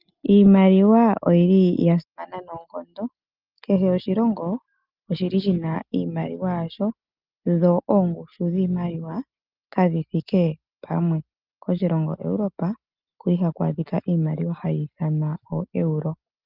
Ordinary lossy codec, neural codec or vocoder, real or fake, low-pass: Opus, 24 kbps; none; real; 5.4 kHz